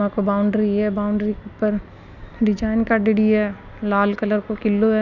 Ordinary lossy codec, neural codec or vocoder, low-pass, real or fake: none; none; 7.2 kHz; real